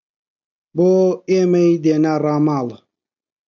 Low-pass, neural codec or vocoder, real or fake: 7.2 kHz; none; real